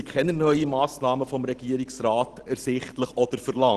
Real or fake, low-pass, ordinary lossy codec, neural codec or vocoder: real; 10.8 kHz; Opus, 16 kbps; none